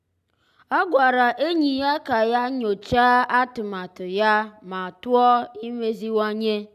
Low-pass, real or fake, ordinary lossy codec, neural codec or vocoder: 14.4 kHz; real; none; none